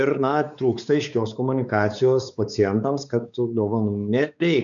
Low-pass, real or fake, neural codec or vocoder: 7.2 kHz; fake; codec, 16 kHz, 4 kbps, X-Codec, WavLM features, trained on Multilingual LibriSpeech